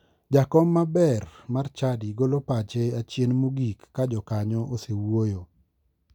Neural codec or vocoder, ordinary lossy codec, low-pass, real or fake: none; none; 19.8 kHz; real